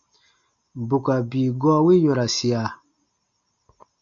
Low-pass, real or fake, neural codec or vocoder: 7.2 kHz; real; none